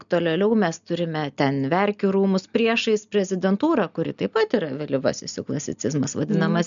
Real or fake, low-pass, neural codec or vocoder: real; 7.2 kHz; none